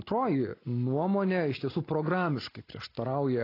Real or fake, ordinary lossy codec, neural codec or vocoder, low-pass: real; AAC, 24 kbps; none; 5.4 kHz